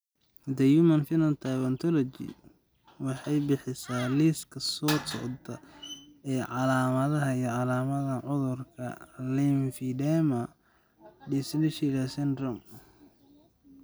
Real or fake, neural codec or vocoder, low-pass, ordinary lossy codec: real; none; none; none